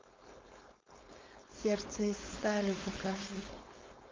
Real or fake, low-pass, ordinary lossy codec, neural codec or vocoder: fake; 7.2 kHz; Opus, 24 kbps; codec, 16 kHz, 4.8 kbps, FACodec